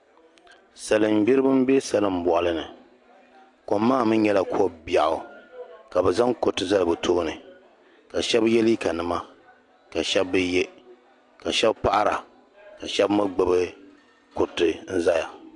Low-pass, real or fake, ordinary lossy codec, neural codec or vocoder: 10.8 kHz; real; AAC, 64 kbps; none